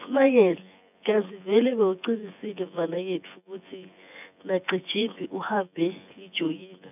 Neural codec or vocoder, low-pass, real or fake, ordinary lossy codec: vocoder, 24 kHz, 100 mel bands, Vocos; 3.6 kHz; fake; none